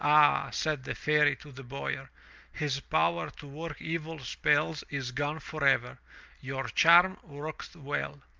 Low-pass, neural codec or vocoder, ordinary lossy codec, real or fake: 7.2 kHz; none; Opus, 24 kbps; real